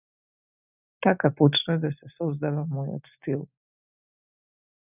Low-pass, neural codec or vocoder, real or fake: 3.6 kHz; none; real